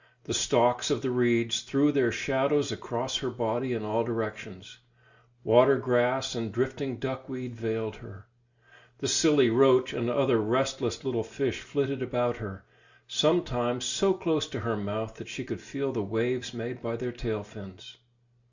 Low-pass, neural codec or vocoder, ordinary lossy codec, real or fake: 7.2 kHz; none; Opus, 64 kbps; real